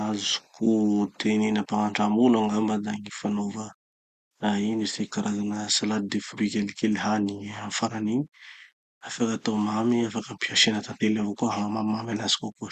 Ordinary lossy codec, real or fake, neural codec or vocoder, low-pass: Opus, 64 kbps; real; none; 14.4 kHz